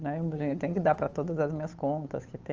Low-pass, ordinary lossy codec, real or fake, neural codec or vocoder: 7.2 kHz; Opus, 24 kbps; fake; codec, 16 kHz, 8 kbps, FunCodec, trained on Chinese and English, 25 frames a second